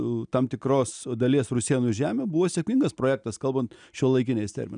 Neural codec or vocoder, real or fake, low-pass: none; real; 9.9 kHz